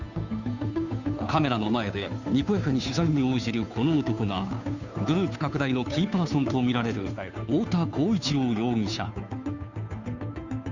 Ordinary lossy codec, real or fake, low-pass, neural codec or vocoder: none; fake; 7.2 kHz; codec, 16 kHz, 2 kbps, FunCodec, trained on Chinese and English, 25 frames a second